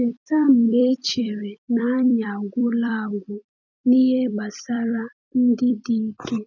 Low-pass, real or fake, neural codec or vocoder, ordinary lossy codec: 7.2 kHz; fake; vocoder, 44.1 kHz, 128 mel bands every 256 samples, BigVGAN v2; none